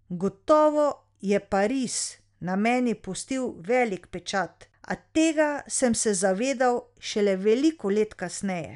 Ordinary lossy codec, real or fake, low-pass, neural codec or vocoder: none; real; 10.8 kHz; none